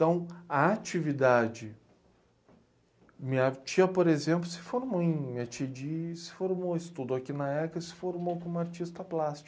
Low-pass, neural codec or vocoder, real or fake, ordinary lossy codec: none; none; real; none